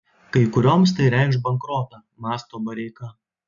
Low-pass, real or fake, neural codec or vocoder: 7.2 kHz; real; none